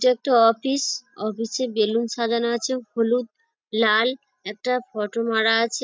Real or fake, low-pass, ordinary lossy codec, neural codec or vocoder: real; none; none; none